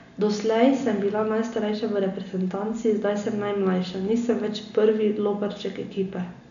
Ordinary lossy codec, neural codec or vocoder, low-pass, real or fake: none; none; 7.2 kHz; real